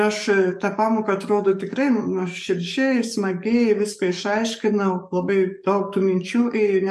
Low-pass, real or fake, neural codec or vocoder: 14.4 kHz; fake; codec, 44.1 kHz, 7.8 kbps, DAC